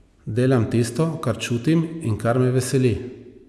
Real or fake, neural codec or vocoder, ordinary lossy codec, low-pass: real; none; none; none